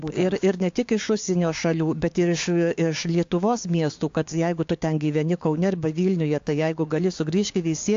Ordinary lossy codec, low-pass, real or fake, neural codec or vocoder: AAC, 48 kbps; 7.2 kHz; fake; codec, 16 kHz, 6 kbps, DAC